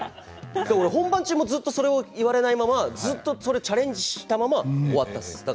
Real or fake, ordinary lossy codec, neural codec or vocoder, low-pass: real; none; none; none